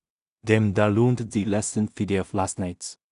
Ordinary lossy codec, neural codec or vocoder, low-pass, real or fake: none; codec, 16 kHz in and 24 kHz out, 0.4 kbps, LongCat-Audio-Codec, two codebook decoder; 10.8 kHz; fake